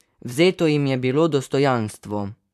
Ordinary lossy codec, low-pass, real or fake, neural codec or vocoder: none; 14.4 kHz; fake; vocoder, 44.1 kHz, 128 mel bands, Pupu-Vocoder